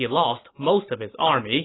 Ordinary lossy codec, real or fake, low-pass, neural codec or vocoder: AAC, 16 kbps; real; 7.2 kHz; none